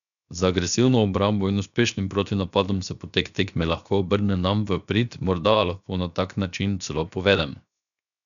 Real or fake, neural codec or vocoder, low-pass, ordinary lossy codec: fake; codec, 16 kHz, 0.7 kbps, FocalCodec; 7.2 kHz; none